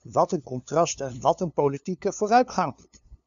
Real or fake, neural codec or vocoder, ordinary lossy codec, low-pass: fake; codec, 16 kHz, 2 kbps, FunCodec, trained on LibriTTS, 25 frames a second; MP3, 96 kbps; 7.2 kHz